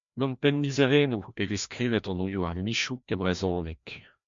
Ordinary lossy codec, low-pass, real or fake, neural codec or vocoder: MP3, 64 kbps; 7.2 kHz; fake; codec, 16 kHz, 1 kbps, FreqCodec, larger model